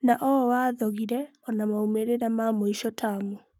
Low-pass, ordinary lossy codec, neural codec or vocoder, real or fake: 19.8 kHz; none; codec, 44.1 kHz, 7.8 kbps, Pupu-Codec; fake